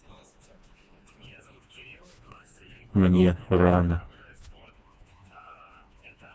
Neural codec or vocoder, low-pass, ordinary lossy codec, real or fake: codec, 16 kHz, 2 kbps, FreqCodec, smaller model; none; none; fake